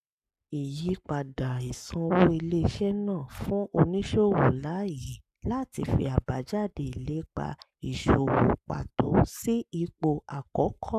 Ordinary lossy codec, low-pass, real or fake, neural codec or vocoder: none; 14.4 kHz; fake; codec, 44.1 kHz, 7.8 kbps, Pupu-Codec